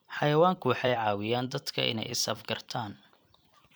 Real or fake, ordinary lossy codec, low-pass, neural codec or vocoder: fake; none; none; vocoder, 44.1 kHz, 128 mel bands every 256 samples, BigVGAN v2